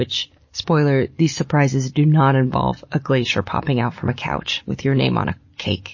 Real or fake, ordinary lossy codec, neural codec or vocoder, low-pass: real; MP3, 32 kbps; none; 7.2 kHz